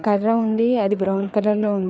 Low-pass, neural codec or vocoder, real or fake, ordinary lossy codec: none; codec, 16 kHz, 4 kbps, FreqCodec, larger model; fake; none